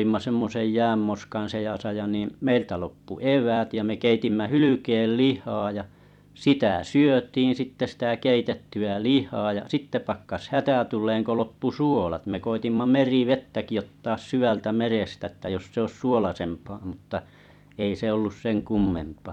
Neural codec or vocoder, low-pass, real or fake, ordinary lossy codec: vocoder, 44.1 kHz, 128 mel bands every 256 samples, BigVGAN v2; 19.8 kHz; fake; none